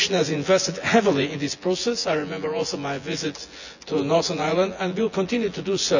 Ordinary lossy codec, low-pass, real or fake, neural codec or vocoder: none; 7.2 kHz; fake; vocoder, 24 kHz, 100 mel bands, Vocos